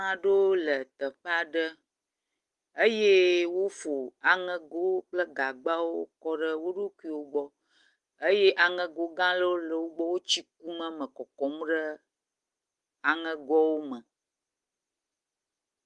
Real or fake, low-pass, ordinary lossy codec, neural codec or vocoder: real; 10.8 kHz; Opus, 24 kbps; none